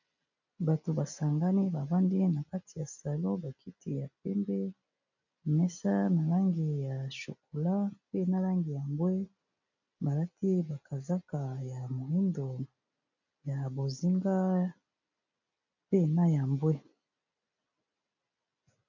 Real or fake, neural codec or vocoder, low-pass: real; none; 7.2 kHz